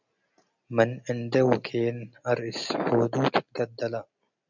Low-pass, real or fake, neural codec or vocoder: 7.2 kHz; real; none